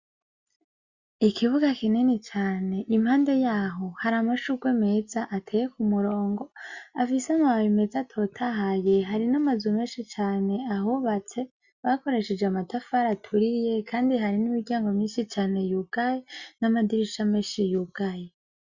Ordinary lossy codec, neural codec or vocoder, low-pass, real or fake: Opus, 64 kbps; none; 7.2 kHz; real